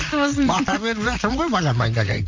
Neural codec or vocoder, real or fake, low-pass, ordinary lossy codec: vocoder, 44.1 kHz, 128 mel bands, Pupu-Vocoder; fake; 7.2 kHz; MP3, 64 kbps